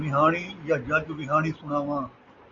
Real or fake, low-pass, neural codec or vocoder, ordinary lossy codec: real; 7.2 kHz; none; Opus, 64 kbps